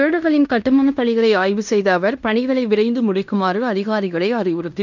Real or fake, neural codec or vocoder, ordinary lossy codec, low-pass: fake; codec, 16 kHz in and 24 kHz out, 0.9 kbps, LongCat-Audio-Codec, fine tuned four codebook decoder; none; 7.2 kHz